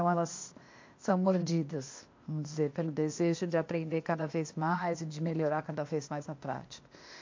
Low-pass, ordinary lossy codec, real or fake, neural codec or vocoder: 7.2 kHz; MP3, 48 kbps; fake; codec, 16 kHz, 0.8 kbps, ZipCodec